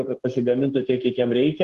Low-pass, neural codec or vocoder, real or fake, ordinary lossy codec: 14.4 kHz; codec, 44.1 kHz, 7.8 kbps, Pupu-Codec; fake; Opus, 16 kbps